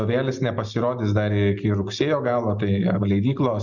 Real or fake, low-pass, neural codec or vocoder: real; 7.2 kHz; none